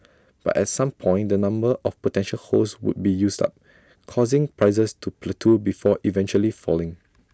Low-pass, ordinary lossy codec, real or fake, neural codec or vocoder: none; none; real; none